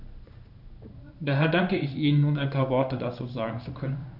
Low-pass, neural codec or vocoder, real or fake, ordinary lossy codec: 5.4 kHz; codec, 16 kHz in and 24 kHz out, 1 kbps, XY-Tokenizer; fake; none